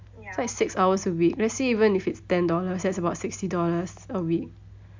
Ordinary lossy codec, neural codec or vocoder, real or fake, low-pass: MP3, 64 kbps; none; real; 7.2 kHz